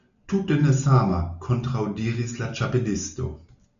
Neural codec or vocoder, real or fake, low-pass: none; real; 7.2 kHz